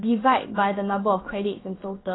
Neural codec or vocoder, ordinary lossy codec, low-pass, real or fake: codec, 16 kHz, about 1 kbps, DyCAST, with the encoder's durations; AAC, 16 kbps; 7.2 kHz; fake